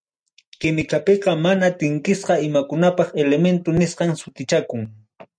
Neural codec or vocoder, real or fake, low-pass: none; real; 9.9 kHz